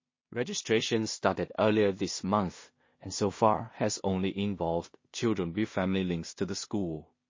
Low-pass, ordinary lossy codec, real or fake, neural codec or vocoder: 7.2 kHz; MP3, 32 kbps; fake; codec, 16 kHz in and 24 kHz out, 0.4 kbps, LongCat-Audio-Codec, two codebook decoder